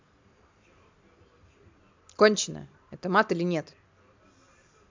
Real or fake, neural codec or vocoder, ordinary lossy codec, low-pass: real; none; MP3, 64 kbps; 7.2 kHz